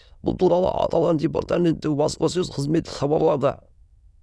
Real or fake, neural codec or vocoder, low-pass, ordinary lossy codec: fake; autoencoder, 22.05 kHz, a latent of 192 numbers a frame, VITS, trained on many speakers; none; none